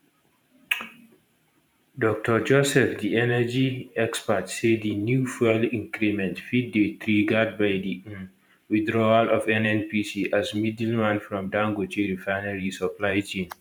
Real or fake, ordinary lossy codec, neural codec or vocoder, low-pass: fake; none; vocoder, 44.1 kHz, 128 mel bands every 512 samples, BigVGAN v2; 19.8 kHz